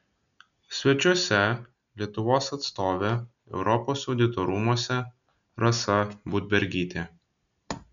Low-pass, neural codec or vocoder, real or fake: 7.2 kHz; none; real